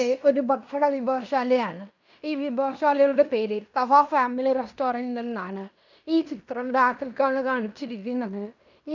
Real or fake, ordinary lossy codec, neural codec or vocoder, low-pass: fake; none; codec, 16 kHz in and 24 kHz out, 0.9 kbps, LongCat-Audio-Codec, fine tuned four codebook decoder; 7.2 kHz